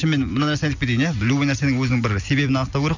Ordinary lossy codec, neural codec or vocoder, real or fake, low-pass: none; none; real; 7.2 kHz